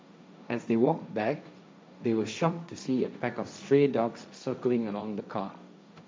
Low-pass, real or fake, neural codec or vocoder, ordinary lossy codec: none; fake; codec, 16 kHz, 1.1 kbps, Voila-Tokenizer; none